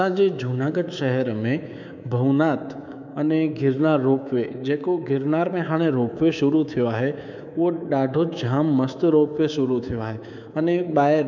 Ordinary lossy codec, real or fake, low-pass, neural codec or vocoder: none; fake; 7.2 kHz; codec, 24 kHz, 3.1 kbps, DualCodec